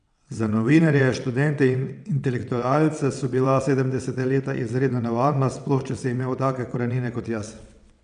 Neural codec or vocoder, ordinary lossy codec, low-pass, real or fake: vocoder, 22.05 kHz, 80 mel bands, WaveNeXt; none; 9.9 kHz; fake